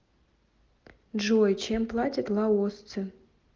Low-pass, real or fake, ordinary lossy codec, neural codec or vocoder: 7.2 kHz; real; Opus, 24 kbps; none